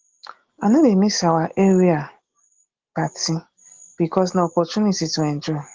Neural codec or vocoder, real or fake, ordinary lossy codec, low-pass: none; real; Opus, 16 kbps; 7.2 kHz